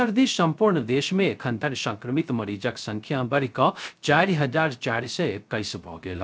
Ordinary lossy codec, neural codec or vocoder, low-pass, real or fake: none; codec, 16 kHz, 0.3 kbps, FocalCodec; none; fake